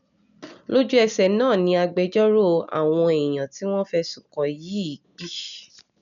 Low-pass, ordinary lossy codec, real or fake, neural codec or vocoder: 7.2 kHz; none; real; none